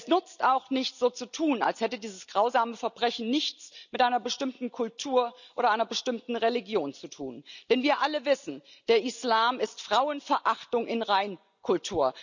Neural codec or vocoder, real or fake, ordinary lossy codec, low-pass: none; real; none; 7.2 kHz